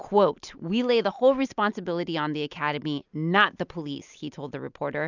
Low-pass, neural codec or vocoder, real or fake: 7.2 kHz; none; real